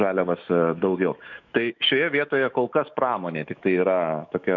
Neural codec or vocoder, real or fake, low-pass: autoencoder, 48 kHz, 128 numbers a frame, DAC-VAE, trained on Japanese speech; fake; 7.2 kHz